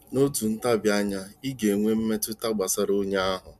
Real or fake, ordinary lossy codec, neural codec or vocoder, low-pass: real; MP3, 96 kbps; none; 14.4 kHz